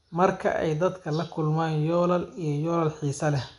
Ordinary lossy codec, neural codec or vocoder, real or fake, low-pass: none; none; real; 10.8 kHz